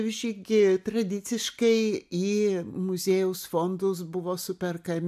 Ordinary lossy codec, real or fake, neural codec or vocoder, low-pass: AAC, 96 kbps; real; none; 14.4 kHz